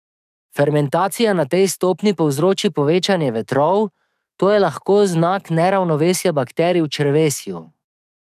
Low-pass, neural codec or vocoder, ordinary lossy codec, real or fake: 14.4 kHz; codec, 44.1 kHz, 7.8 kbps, DAC; none; fake